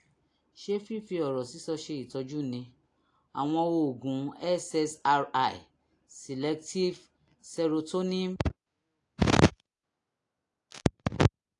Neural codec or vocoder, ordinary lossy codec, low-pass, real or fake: none; AAC, 48 kbps; 10.8 kHz; real